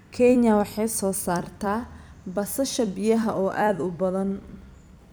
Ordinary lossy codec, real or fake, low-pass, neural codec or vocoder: none; fake; none; vocoder, 44.1 kHz, 128 mel bands every 256 samples, BigVGAN v2